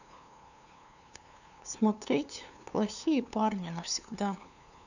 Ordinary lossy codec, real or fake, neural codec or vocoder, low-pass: none; fake; codec, 16 kHz, 2 kbps, FunCodec, trained on LibriTTS, 25 frames a second; 7.2 kHz